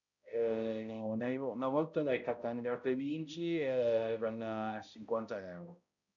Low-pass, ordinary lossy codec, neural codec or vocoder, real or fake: 7.2 kHz; none; codec, 16 kHz, 0.5 kbps, X-Codec, HuBERT features, trained on balanced general audio; fake